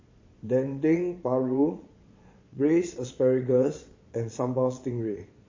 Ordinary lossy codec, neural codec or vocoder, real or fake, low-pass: MP3, 32 kbps; vocoder, 22.05 kHz, 80 mel bands, Vocos; fake; 7.2 kHz